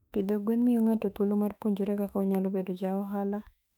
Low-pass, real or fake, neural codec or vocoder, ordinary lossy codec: 19.8 kHz; fake; autoencoder, 48 kHz, 32 numbers a frame, DAC-VAE, trained on Japanese speech; none